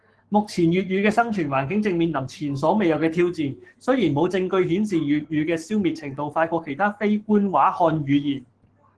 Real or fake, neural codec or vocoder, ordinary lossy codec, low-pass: fake; codec, 44.1 kHz, 7.8 kbps, Pupu-Codec; Opus, 16 kbps; 10.8 kHz